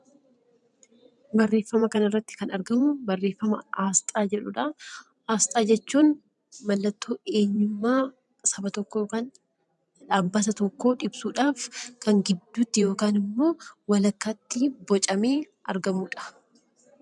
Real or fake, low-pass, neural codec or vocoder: fake; 10.8 kHz; vocoder, 44.1 kHz, 128 mel bands every 512 samples, BigVGAN v2